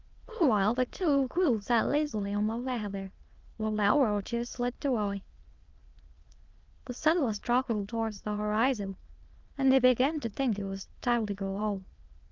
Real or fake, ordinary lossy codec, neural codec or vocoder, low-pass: fake; Opus, 32 kbps; autoencoder, 22.05 kHz, a latent of 192 numbers a frame, VITS, trained on many speakers; 7.2 kHz